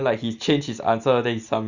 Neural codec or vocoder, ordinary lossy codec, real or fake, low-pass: none; none; real; 7.2 kHz